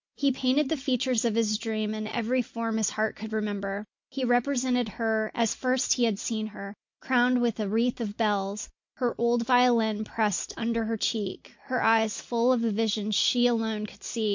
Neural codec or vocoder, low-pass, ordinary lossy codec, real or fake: none; 7.2 kHz; MP3, 48 kbps; real